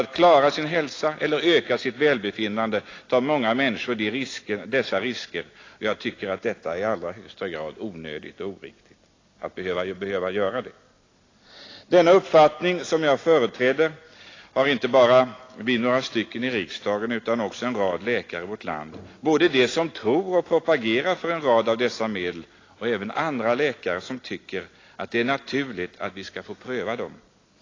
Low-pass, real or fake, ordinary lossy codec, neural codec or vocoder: 7.2 kHz; real; AAC, 32 kbps; none